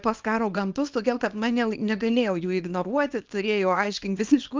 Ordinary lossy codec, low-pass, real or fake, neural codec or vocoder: Opus, 24 kbps; 7.2 kHz; fake; codec, 24 kHz, 0.9 kbps, WavTokenizer, small release